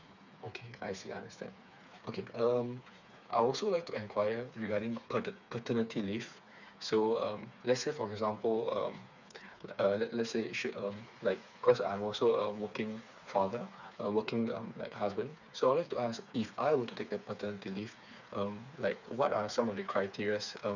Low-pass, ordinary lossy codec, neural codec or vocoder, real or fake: 7.2 kHz; none; codec, 16 kHz, 4 kbps, FreqCodec, smaller model; fake